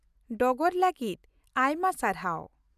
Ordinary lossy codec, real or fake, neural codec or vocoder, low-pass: none; real; none; 14.4 kHz